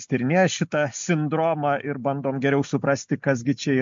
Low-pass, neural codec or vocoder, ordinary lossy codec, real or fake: 7.2 kHz; none; MP3, 48 kbps; real